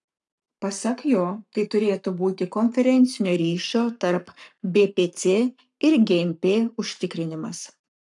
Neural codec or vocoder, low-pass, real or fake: codec, 44.1 kHz, 7.8 kbps, Pupu-Codec; 10.8 kHz; fake